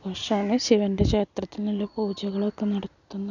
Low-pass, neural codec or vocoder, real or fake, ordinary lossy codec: 7.2 kHz; none; real; none